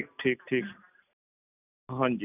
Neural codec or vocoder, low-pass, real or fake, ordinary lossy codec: none; 3.6 kHz; real; none